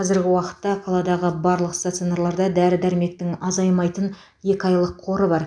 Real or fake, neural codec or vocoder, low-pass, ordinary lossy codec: real; none; 9.9 kHz; none